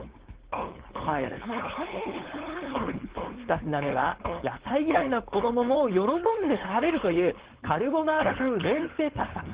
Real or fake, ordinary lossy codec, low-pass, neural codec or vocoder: fake; Opus, 16 kbps; 3.6 kHz; codec, 16 kHz, 4.8 kbps, FACodec